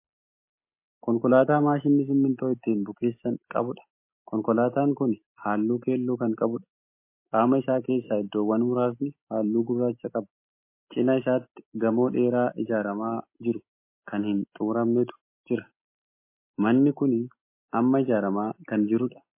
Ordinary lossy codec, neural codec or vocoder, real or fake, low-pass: MP3, 24 kbps; none; real; 3.6 kHz